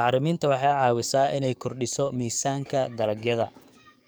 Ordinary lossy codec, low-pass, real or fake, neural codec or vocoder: none; none; fake; codec, 44.1 kHz, 7.8 kbps, DAC